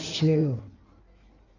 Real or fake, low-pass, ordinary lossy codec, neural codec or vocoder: fake; 7.2 kHz; none; codec, 16 kHz in and 24 kHz out, 1.1 kbps, FireRedTTS-2 codec